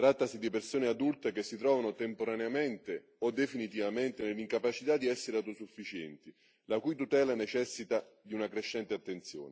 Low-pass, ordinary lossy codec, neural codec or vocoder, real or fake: none; none; none; real